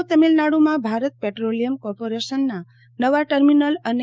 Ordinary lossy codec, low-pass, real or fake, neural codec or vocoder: none; none; fake; codec, 16 kHz, 6 kbps, DAC